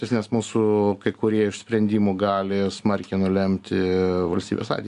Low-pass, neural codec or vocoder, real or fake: 10.8 kHz; none; real